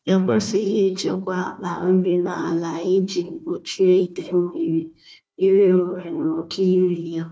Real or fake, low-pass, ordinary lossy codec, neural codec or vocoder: fake; none; none; codec, 16 kHz, 1 kbps, FunCodec, trained on Chinese and English, 50 frames a second